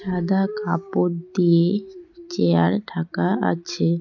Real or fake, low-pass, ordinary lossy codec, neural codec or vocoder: real; 7.2 kHz; none; none